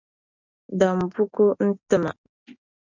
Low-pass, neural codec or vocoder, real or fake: 7.2 kHz; none; real